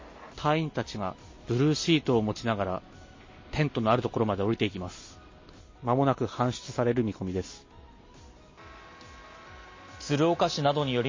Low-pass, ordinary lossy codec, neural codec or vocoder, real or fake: 7.2 kHz; MP3, 32 kbps; none; real